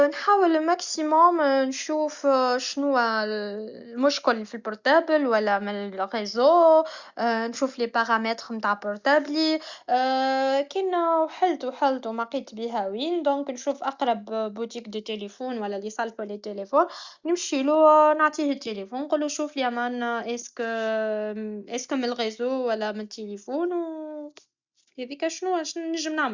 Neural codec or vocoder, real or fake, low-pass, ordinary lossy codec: none; real; 7.2 kHz; Opus, 64 kbps